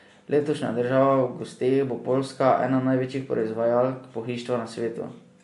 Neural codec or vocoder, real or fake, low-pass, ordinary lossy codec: none; real; 14.4 kHz; MP3, 48 kbps